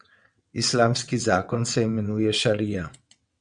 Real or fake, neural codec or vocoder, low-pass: fake; vocoder, 22.05 kHz, 80 mel bands, WaveNeXt; 9.9 kHz